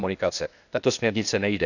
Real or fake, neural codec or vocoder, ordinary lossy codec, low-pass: fake; codec, 16 kHz, 0.8 kbps, ZipCodec; none; 7.2 kHz